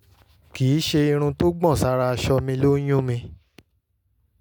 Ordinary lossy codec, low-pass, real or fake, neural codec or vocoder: none; none; real; none